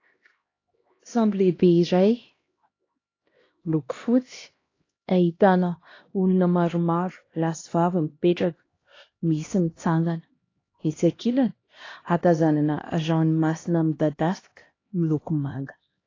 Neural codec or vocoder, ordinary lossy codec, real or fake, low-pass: codec, 16 kHz, 1 kbps, X-Codec, HuBERT features, trained on LibriSpeech; AAC, 32 kbps; fake; 7.2 kHz